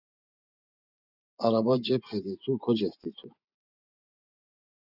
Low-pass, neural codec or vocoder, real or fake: 5.4 kHz; vocoder, 24 kHz, 100 mel bands, Vocos; fake